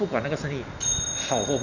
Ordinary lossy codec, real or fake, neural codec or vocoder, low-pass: none; real; none; 7.2 kHz